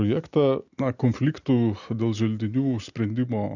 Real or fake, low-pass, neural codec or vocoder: real; 7.2 kHz; none